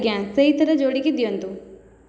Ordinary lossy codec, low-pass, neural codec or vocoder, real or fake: none; none; none; real